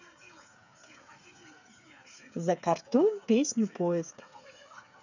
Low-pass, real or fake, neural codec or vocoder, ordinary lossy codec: 7.2 kHz; fake; codec, 16 kHz, 8 kbps, FreqCodec, smaller model; none